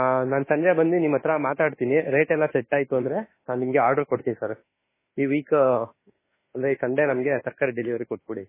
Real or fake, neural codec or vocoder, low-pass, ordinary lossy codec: fake; autoencoder, 48 kHz, 32 numbers a frame, DAC-VAE, trained on Japanese speech; 3.6 kHz; MP3, 16 kbps